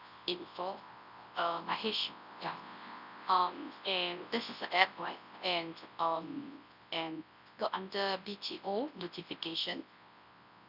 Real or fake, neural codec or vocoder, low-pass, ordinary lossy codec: fake; codec, 24 kHz, 0.9 kbps, WavTokenizer, large speech release; 5.4 kHz; none